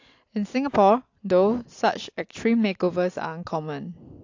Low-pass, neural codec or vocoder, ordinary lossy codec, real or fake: 7.2 kHz; none; AAC, 48 kbps; real